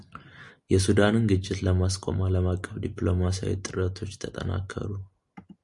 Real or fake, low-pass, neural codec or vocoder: real; 10.8 kHz; none